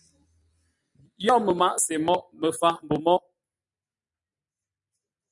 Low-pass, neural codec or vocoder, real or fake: 10.8 kHz; none; real